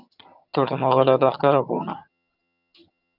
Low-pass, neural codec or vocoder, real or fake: 5.4 kHz; vocoder, 22.05 kHz, 80 mel bands, HiFi-GAN; fake